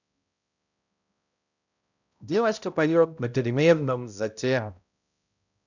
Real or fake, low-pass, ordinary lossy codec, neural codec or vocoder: fake; 7.2 kHz; none; codec, 16 kHz, 0.5 kbps, X-Codec, HuBERT features, trained on balanced general audio